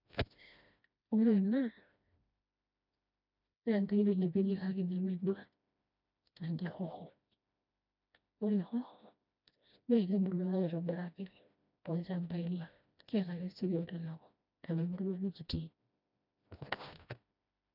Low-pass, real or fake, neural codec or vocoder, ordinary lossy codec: 5.4 kHz; fake; codec, 16 kHz, 1 kbps, FreqCodec, smaller model; none